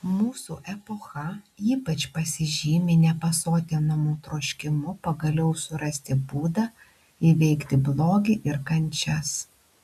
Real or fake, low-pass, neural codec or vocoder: real; 14.4 kHz; none